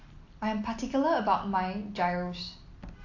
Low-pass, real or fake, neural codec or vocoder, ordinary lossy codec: 7.2 kHz; real; none; AAC, 48 kbps